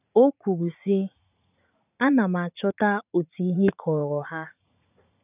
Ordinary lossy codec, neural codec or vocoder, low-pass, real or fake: none; vocoder, 44.1 kHz, 80 mel bands, Vocos; 3.6 kHz; fake